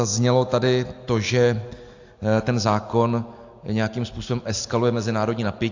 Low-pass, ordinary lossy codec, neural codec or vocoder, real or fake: 7.2 kHz; AAC, 48 kbps; none; real